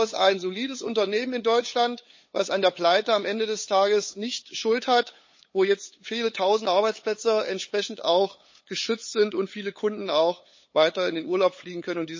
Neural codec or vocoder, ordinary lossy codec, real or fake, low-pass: codec, 16 kHz, 8 kbps, FunCodec, trained on LibriTTS, 25 frames a second; MP3, 32 kbps; fake; 7.2 kHz